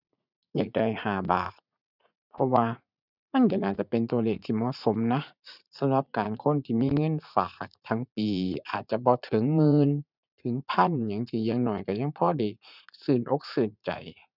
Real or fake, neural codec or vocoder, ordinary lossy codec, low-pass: fake; vocoder, 44.1 kHz, 80 mel bands, Vocos; none; 5.4 kHz